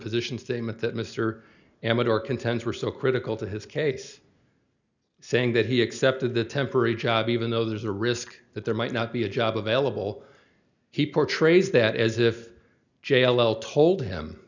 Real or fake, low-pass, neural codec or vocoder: real; 7.2 kHz; none